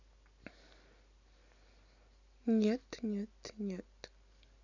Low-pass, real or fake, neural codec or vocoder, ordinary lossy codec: 7.2 kHz; real; none; none